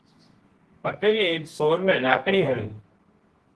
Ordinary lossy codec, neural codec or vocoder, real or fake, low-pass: Opus, 16 kbps; codec, 24 kHz, 0.9 kbps, WavTokenizer, medium music audio release; fake; 10.8 kHz